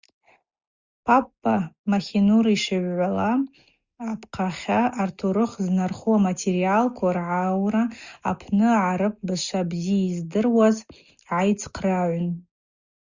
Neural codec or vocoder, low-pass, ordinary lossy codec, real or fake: none; 7.2 kHz; Opus, 64 kbps; real